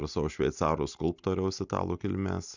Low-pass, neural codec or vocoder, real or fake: 7.2 kHz; none; real